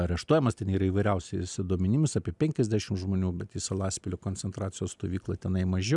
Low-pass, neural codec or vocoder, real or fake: 10.8 kHz; none; real